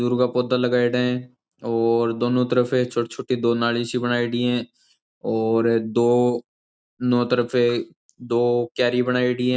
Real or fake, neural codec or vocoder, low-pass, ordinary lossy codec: real; none; none; none